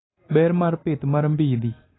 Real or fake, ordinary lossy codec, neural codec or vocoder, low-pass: real; AAC, 16 kbps; none; 7.2 kHz